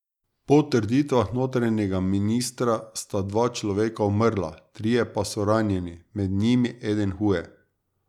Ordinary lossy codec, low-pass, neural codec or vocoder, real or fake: none; 19.8 kHz; none; real